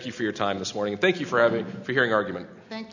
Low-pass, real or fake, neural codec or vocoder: 7.2 kHz; real; none